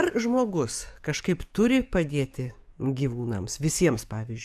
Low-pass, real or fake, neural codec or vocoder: 14.4 kHz; fake; codec, 44.1 kHz, 7.8 kbps, DAC